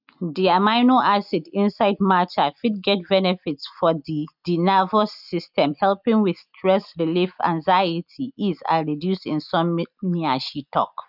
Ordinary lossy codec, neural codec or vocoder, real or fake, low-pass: none; none; real; 5.4 kHz